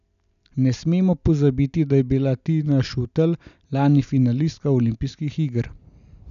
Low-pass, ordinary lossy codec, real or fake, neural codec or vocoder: 7.2 kHz; none; real; none